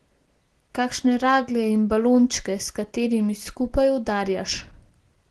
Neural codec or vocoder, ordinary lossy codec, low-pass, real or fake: none; Opus, 16 kbps; 10.8 kHz; real